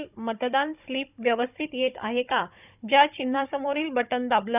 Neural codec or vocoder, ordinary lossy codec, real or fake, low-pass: codec, 16 kHz, 16 kbps, FunCodec, trained on Chinese and English, 50 frames a second; none; fake; 3.6 kHz